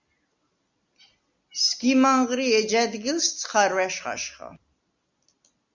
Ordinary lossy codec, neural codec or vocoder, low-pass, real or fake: Opus, 64 kbps; none; 7.2 kHz; real